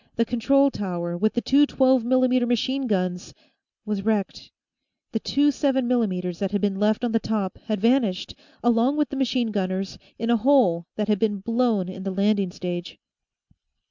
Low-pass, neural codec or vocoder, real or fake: 7.2 kHz; none; real